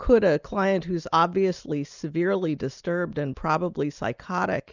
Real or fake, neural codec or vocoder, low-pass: real; none; 7.2 kHz